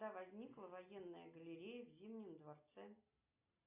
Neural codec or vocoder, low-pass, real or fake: none; 3.6 kHz; real